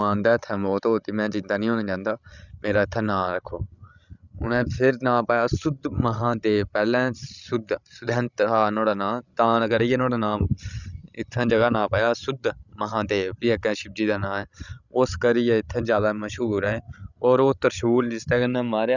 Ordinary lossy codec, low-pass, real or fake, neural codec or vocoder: none; 7.2 kHz; fake; codec, 16 kHz, 16 kbps, FreqCodec, larger model